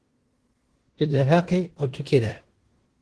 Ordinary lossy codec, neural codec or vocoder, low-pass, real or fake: Opus, 16 kbps; codec, 16 kHz in and 24 kHz out, 0.9 kbps, LongCat-Audio-Codec, fine tuned four codebook decoder; 10.8 kHz; fake